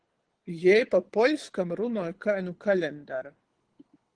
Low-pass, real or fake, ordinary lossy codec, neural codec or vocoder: 9.9 kHz; fake; Opus, 16 kbps; codec, 24 kHz, 6 kbps, HILCodec